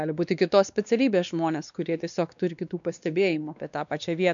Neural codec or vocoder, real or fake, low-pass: codec, 16 kHz, 2 kbps, X-Codec, WavLM features, trained on Multilingual LibriSpeech; fake; 7.2 kHz